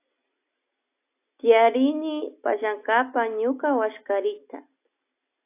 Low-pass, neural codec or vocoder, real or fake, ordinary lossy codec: 3.6 kHz; none; real; AAC, 24 kbps